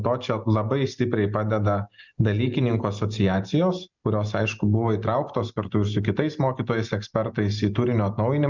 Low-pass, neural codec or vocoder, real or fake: 7.2 kHz; none; real